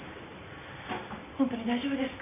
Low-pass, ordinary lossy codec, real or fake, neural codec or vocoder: 3.6 kHz; none; real; none